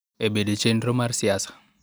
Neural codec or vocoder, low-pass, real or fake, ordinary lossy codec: none; none; real; none